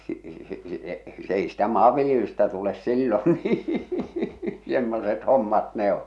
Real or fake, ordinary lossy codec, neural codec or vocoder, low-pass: real; none; none; none